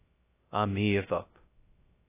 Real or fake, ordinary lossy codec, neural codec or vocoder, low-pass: fake; AAC, 16 kbps; codec, 16 kHz, 0.2 kbps, FocalCodec; 3.6 kHz